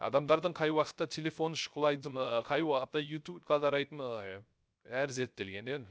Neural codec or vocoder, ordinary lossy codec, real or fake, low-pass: codec, 16 kHz, 0.3 kbps, FocalCodec; none; fake; none